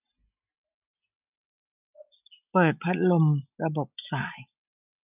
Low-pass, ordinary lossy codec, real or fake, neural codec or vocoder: 3.6 kHz; none; real; none